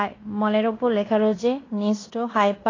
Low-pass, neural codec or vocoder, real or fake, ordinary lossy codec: 7.2 kHz; codec, 16 kHz in and 24 kHz out, 0.9 kbps, LongCat-Audio-Codec, fine tuned four codebook decoder; fake; AAC, 32 kbps